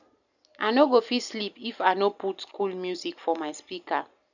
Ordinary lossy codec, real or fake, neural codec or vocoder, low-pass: none; real; none; 7.2 kHz